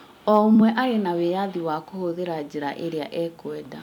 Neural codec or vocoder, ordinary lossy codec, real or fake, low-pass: vocoder, 44.1 kHz, 128 mel bands every 256 samples, BigVGAN v2; none; fake; 19.8 kHz